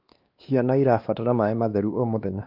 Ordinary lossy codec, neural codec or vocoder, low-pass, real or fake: Opus, 32 kbps; codec, 16 kHz, 2 kbps, X-Codec, WavLM features, trained on Multilingual LibriSpeech; 5.4 kHz; fake